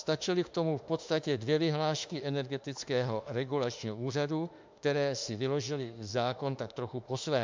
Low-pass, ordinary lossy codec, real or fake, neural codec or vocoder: 7.2 kHz; MP3, 64 kbps; fake; autoencoder, 48 kHz, 32 numbers a frame, DAC-VAE, trained on Japanese speech